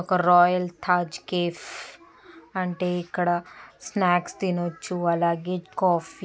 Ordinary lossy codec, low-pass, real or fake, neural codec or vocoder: none; none; real; none